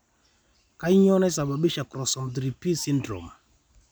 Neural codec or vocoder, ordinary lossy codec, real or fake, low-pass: none; none; real; none